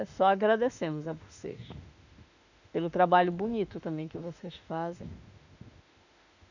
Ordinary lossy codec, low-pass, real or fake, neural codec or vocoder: none; 7.2 kHz; fake; autoencoder, 48 kHz, 32 numbers a frame, DAC-VAE, trained on Japanese speech